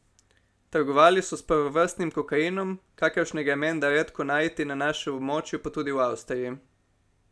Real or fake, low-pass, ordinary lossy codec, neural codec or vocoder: real; none; none; none